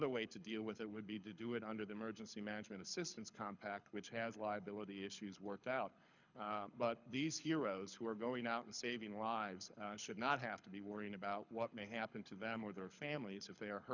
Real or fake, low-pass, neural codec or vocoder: fake; 7.2 kHz; codec, 24 kHz, 6 kbps, HILCodec